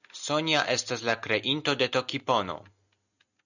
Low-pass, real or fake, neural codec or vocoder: 7.2 kHz; real; none